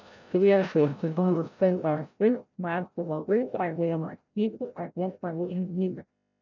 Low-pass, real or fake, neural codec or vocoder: 7.2 kHz; fake; codec, 16 kHz, 0.5 kbps, FreqCodec, larger model